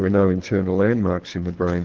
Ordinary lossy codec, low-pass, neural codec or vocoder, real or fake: Opus, 16 kbps; 7.2 kHz; codec, 24 kHz, 3 kbps, HILCodec; fake